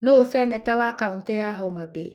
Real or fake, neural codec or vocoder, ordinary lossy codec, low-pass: fake; codec, 44.1 kHz, 2.6 kbps, DAC; none; 19.8 kHz